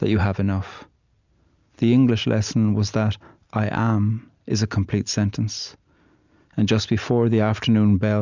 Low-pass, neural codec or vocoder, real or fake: 7.2 kHz; none; real